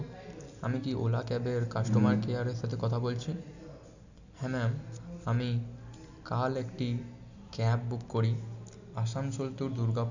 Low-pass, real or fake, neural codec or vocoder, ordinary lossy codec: 7.2 kHz; real; none; none